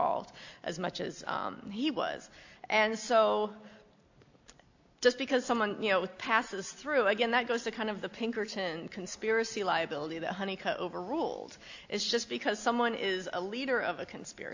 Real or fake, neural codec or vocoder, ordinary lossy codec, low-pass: real; none; AAC, 48 kbps; 7.2 kHz